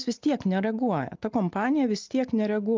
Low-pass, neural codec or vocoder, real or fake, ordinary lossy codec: 7.2 kHz; none; real; Opus, 24 kbps